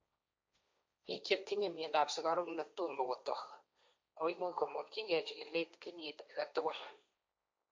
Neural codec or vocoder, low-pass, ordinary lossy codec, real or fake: codec, 16 kHz, 1.1 kbps, Voila-Tokenizer; none; none; fake